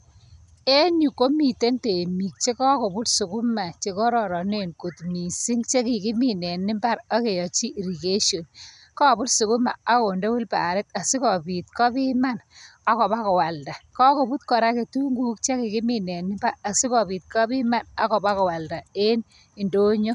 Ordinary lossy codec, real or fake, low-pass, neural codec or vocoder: none; real; 10.8 kHz; none